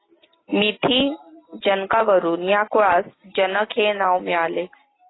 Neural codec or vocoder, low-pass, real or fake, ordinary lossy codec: none; 7.2 kHz; real; AAC, 16 kbps